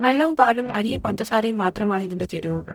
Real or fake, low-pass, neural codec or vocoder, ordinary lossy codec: fake; 19.8 kHz; codec, 44.1 kHz, 0.9 kbps, DAC; none